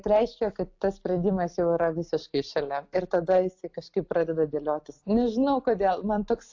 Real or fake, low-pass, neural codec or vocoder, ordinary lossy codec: real; 7.2 kHz; none; AAC, 48 kbps